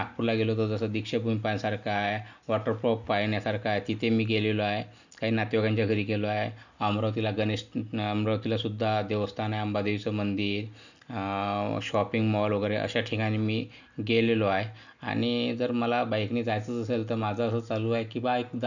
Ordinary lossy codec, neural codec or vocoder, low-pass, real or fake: none; none; 7.2 kHz; real